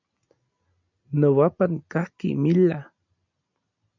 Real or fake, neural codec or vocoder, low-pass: real; none; 7.2 kHz